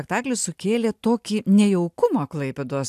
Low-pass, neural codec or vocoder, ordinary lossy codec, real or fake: 14.4 kHz; none; AAC, 96 kbps; real